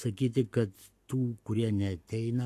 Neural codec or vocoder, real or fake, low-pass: codec, 44.1 kHz, 7.8 kbps, Pupu-Codec; fake; 14.4 kHz